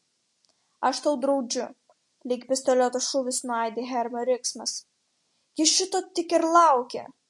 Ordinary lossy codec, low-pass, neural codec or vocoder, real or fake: MP3, 48 kbps; 10.8 kHz; none; real